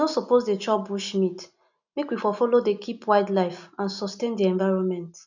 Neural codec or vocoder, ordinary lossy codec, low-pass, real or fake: none; none; 7.2 kHz; real